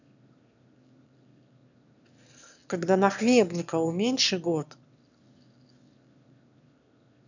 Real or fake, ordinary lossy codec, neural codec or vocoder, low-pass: fake; none; autoencoder, 22.05 kHz, a latent of 192 numbers a frame, VITS, trained on one speaker; 7.2 kHz